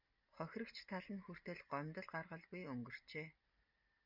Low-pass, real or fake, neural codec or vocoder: 5.4 kHz; real; none